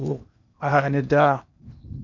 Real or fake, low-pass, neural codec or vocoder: fake; 7.2 kHz; codec, 16 kHz in and 24 kHz out, 0.8 kbps, FocalCodec, streaming, 65536 codes